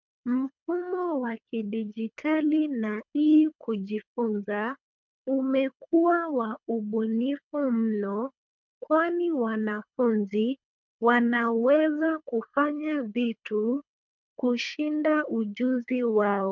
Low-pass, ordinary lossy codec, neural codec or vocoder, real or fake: 7.2 kHz; MP3, 64 kbps; codec, 24 kHz, 3 kbps, HILCodec; fake